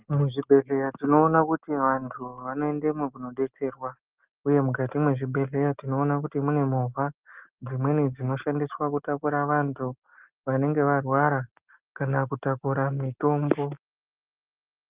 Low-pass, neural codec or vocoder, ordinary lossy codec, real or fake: 3.6 kHz; none; Opus, 32 kbps; real